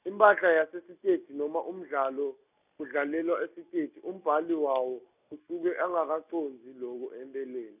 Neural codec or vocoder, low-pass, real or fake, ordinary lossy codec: none; 3.6 kHz; real; none